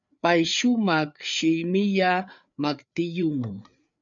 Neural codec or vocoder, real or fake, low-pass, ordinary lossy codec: codec, 16 kHz, 4 kbps, FreqCodec, larger model; fake; 7.2 kHz; MP3, 96 kbps